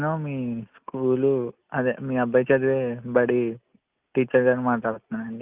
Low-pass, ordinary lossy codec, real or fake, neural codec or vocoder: 3.6 kHz; Opus, 24 kbps; real; none